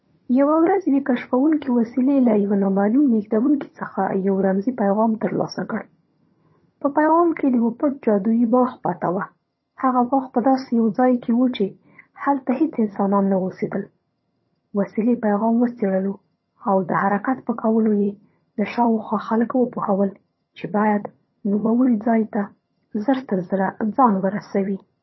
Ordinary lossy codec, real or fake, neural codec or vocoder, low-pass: MP3, 24 kbps; fake; vocoder, 22.05 kHz, 80 mel bands, HiFi-GAN; 7.2 kHz